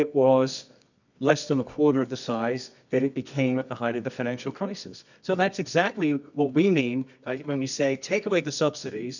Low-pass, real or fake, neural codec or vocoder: 7.2 kHz; fake; codec, 24 kHz, 0.9 kbps, WavTokenizer, medium music audio release